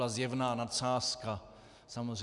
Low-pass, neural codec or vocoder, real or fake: 10.8 kHz; none; real